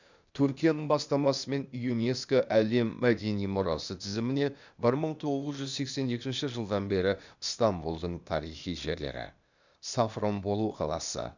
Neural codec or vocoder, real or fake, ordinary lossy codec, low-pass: codec, 16 kHz, 0.8 kbps, ZipCodec; fake; none; 7.2 kHz